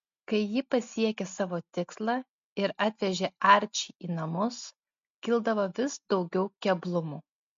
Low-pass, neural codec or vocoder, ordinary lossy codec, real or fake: 7.2 kHz; none; MP3, 48 kbps; real